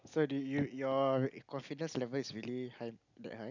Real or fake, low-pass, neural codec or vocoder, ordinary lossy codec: real; 7.2 kHz; none; none